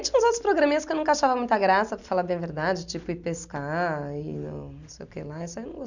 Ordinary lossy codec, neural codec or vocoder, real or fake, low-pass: none; none; real; 7.2 kHz